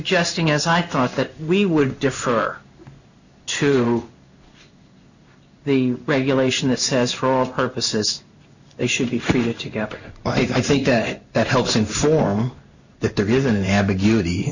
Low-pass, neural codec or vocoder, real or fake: 7.2 kHz; codec, 16 kHz in and 24 kHz out, 1 kbps, XY-Tokenizer; fake